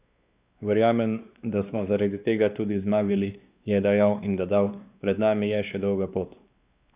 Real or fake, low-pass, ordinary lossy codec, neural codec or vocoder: fake; 3.6 kHz; Opus, 64 kbps; codec, 16 kHz, 2 kbps, X-Codec, WavLM features, trained on Multilingual LibriSpeech